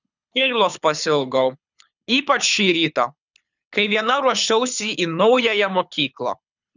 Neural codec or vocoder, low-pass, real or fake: codec, 24 kHz, 6 kbps, HILCodec; 7.2 kHz; fake